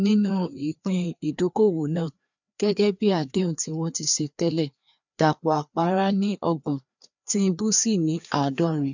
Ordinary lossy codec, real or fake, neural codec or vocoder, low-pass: none; fake; codec, 16 kHz, 2 kbps, FreqCodec, larger model; 7.2 kHz